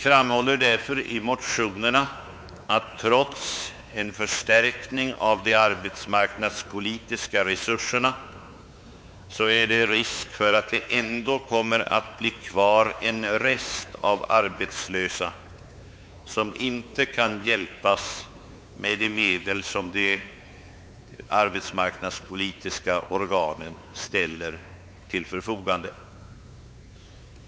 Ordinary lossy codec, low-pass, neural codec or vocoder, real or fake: none; none; codec, 16 kHz, 4 kbps, X-Codec, WavLM features, trained on Multilingual LibriSpeech; fake